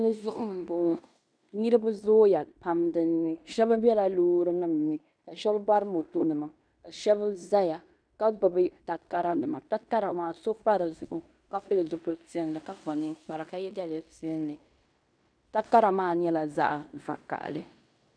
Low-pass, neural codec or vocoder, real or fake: 9.9 kHz; codec, 16 kHz in and 24 kHz out, 0.9 kbps, LongCat-Audio-Codec, fine tuned four codebook decoder; fake